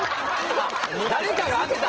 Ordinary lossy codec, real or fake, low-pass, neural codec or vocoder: Opus, 16 kbps; real; 7.2 kHz; none